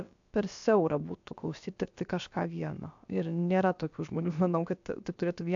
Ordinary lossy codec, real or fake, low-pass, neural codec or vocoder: AAC, 64 kbps; fake; 7.2 kHz; codec, 16 kHz, about 1 kbps, DyCAST, with the encoder's durations